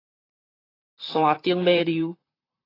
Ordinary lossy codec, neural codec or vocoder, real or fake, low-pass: AAC, 24 kbps; vocoder, 44.1 kHz, 128 mel bands, Pupu-Vocoder; fake; 5.4 kHz